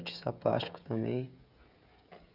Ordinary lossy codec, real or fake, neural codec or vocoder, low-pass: none; fake; codec, 16 kHz, 16 kbps, FreqCodec, smaller model; 5.4 kHz